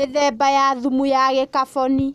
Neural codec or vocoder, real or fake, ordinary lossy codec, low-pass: none; real; none; 14.4 kHz